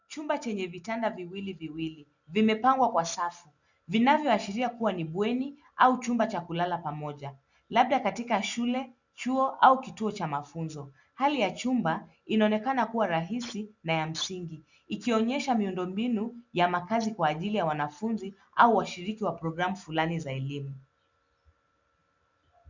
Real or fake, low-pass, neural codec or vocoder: real; 7.2 kHz; none